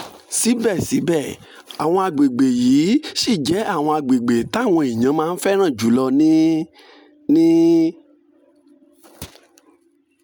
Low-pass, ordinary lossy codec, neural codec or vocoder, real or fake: 19.8 kHz; none; none; real